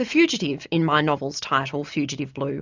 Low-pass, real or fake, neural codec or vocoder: 7.2 kHz; real; none